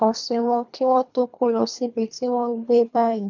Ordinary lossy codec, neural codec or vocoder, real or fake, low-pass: MP3, 64 kbps; codec, 24 kHz, 3 kbps, HILCodec; fake; 7.2 kHz